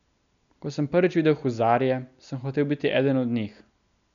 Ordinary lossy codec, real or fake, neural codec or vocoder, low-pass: none; real; none; 7.2 kHz